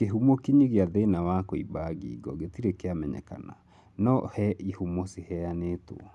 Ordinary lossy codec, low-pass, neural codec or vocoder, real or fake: none; none; none; real